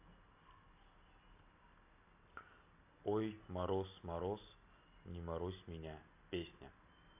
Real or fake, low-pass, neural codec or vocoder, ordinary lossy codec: real; 3.6 kHz; none; none